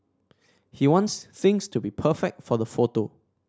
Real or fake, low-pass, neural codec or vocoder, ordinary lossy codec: real; none; none; none